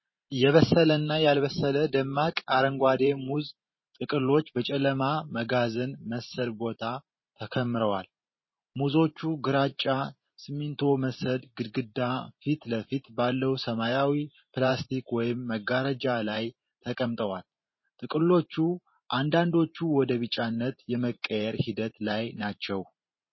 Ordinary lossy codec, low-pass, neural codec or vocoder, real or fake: MP3, 24 kbps; 7.2 kHz; vocoder, 44.1 kHz, 128 mel bands every 512 samples, BigVGAN v2; fake